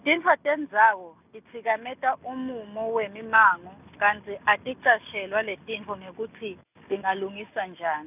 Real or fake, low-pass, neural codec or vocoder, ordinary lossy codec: real; 3.6 kHz; none; none